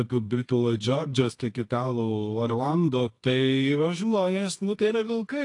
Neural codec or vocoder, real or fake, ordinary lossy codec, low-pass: codec, 24 kHz, 0.9 kbps, WavTokenizer, medium music audio release; fake; AAC, 48 kbps; 10.8 kHz